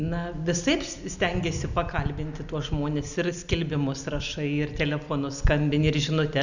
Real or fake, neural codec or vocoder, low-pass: real; none; 7.2 kHz